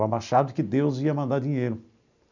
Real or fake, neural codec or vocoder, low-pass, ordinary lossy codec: real; none; 7.2 kHz; none